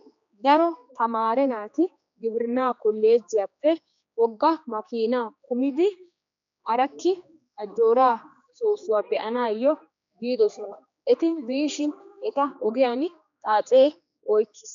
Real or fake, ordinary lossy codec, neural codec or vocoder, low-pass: fake; MP3, 64 kbps; codec, 16 kHz, 2 kbps, X-Codec, HuBERT features, trained on general audio; 7.2 kHz